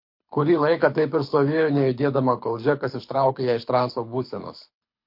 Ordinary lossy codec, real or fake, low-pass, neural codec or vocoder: MP3, 32 kbps; fake; 5.4 kHz; codec, 24 kHz, 6 kbps, HILCodec